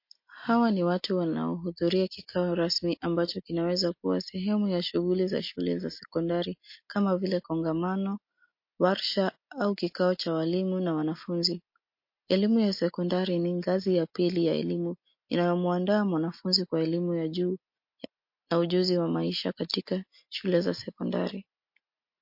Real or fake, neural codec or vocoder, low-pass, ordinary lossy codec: real; none; 5.4 kHz; MP3, 32 kbps